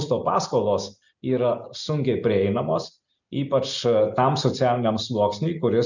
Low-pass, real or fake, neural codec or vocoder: 7.2 kHz; real; none